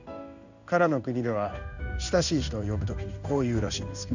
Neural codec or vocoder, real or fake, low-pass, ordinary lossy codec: codec, 16 kHz in and 24 kHz out, 1 kbps, XY-Tokenizer; fake; 7.2 kHz; none